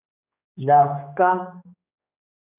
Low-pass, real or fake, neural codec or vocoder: 3.6 kHz; fake; codec, 16 kHz, 2 kbps, X-Codec, HuBERT features, trained on general audio